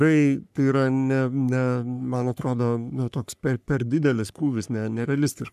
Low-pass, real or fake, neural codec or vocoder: 14.4 kHz; fake; codec, 44.1 kHz, 3.4 kbps, Pupu-Codec